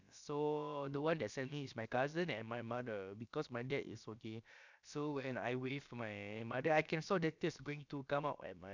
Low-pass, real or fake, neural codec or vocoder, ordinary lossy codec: 7.2 kHz; fake; codec, 16 kHz, about 1 kbps, DyCAST, with the encoder's durations; none